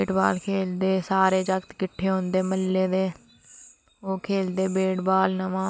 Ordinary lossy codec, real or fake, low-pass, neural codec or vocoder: none; real; none; none